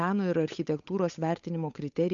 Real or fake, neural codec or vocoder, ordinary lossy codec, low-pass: fake; codec, 16 kHz, 8 kbps, FunCodec, trained on Chinese and English, 25 frames a second; MP3, 64 kbps; 7.2 kHz